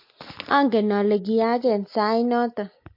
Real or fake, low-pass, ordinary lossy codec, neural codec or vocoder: real; 5.4 kHz; MP3, 32 kbps; none